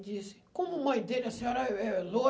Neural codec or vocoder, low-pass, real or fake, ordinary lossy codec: none; none; real; none